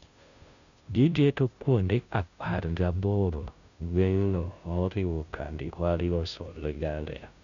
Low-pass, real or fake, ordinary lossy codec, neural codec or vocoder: 7.2 kHz; fake; none; codec, 16 kHz, 0.5 kbps, FunCodec, trained on Chinese and English, 25 frames a second